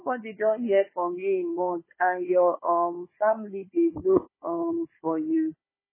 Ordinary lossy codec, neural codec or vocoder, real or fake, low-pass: MP3, 16 kbps; codec, 16 kHz, 4 kbps, FunCodec, trained on Chinese and English, 50 frames a second; fake; 3.6 kHz